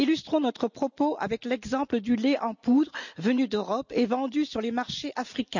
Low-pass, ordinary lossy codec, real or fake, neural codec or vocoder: 7.2 kHz; none; real; none